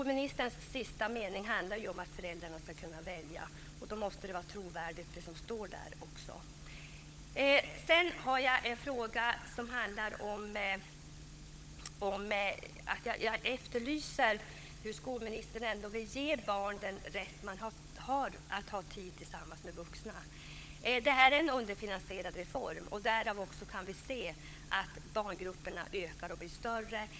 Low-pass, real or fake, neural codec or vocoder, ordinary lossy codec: none; fake; codec, 16 kHz, 16 kbps, FunCodec, trained on LibriTTS, 50 frames a second; none